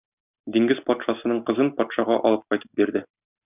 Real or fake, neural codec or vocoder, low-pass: real; none; 3.6 kHz